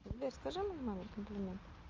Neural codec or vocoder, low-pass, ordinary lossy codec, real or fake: none; 7.2 kHz; Opus, 32 kbps; real